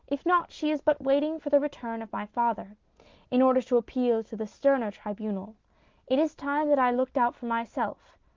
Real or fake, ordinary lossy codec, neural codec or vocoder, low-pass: real; Opus, 32 kbps; none; 7.2 kHz